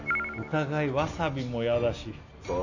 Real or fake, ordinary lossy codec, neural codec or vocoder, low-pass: real; none; none; 7.2 kHz